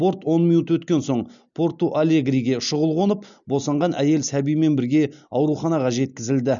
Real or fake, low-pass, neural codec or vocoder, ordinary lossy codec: real; 7.2 kHz; none; none